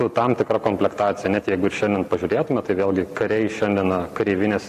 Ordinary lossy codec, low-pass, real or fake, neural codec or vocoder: MP3, 96 kbps; 14.4 kHz; real; none